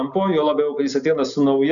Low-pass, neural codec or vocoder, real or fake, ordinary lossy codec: 7.2 kHz; none; real; MP3, 96 kbps